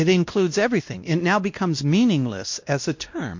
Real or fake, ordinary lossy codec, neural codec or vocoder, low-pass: fake; MP3, 48 kbps; codec, 16 kHz, 1 kbps, X-Codec, WavLM features, trained on Multilingual LibriSpeech; 7.2 kHz